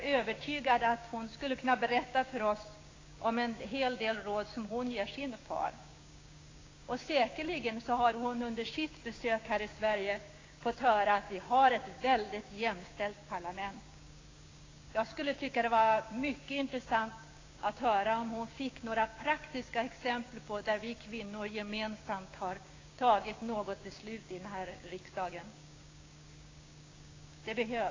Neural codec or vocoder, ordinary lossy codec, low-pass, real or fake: codec, 16 kHz, 6 kbps, DAC; AAC, 32 kbps; 7.2 kHz; fake